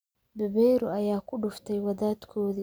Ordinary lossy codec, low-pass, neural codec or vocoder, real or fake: none; none; none; real